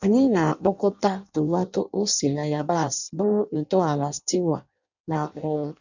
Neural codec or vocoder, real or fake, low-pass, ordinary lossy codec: codec, 16 kHz in and 24 kHz out, 0.6 kbps, FireRedTTS-2 codec; fake; 7.2 kHz; none